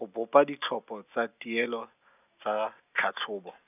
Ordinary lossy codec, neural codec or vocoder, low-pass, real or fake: none; none; 3.6 kHz; real